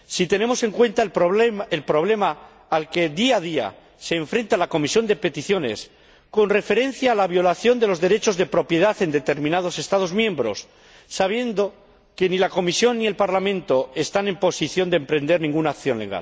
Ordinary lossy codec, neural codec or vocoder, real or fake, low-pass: none; none; real; none